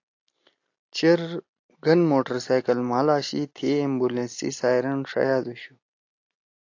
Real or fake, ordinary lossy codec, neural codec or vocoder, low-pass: real; AAC, 48 kbps; none; 7.2 kHz